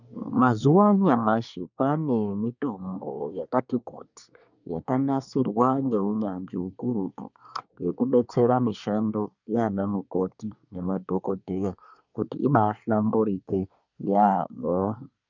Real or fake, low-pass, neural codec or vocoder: fake; 7.2 kHz; codec, 24 kHz, 1 kbps, SNAC